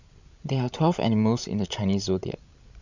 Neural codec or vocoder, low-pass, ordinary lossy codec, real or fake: codec, 16 kHz, 16 kbps, FreqCodec, larger model; 7.2 kHz; none; fake